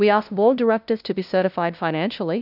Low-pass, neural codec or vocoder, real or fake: 5.4 kHz; codec, 16 kHz, 0.5 kbps, FunCodec, trained on LibriTTS, 25 frames a second; fake